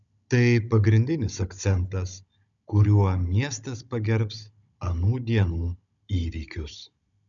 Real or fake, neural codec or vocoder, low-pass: fake; codec, 16 kHz, 16 kbps, FunCodec, trained on Chinese and English, 50 frames a second; 7.2 kHz